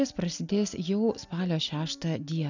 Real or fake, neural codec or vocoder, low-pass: real; none; 7.2 kHz